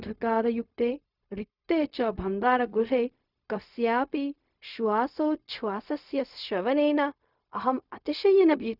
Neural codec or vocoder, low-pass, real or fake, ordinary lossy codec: codec, 16 kHz, 0.4 kbps, LongCat-Audio-Codec; 5.4 kHz; fake; none